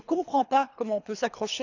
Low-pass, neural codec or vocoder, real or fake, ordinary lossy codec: 7.2 kHz; codec, 24 kHz, 3 kbps, HILCodec; fake; none